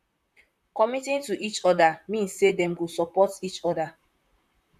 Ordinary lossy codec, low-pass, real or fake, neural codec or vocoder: none; 14.4 kHz; fake; vocoder, 44.1 kHz, 128 mel bands, Pupu-Vocoder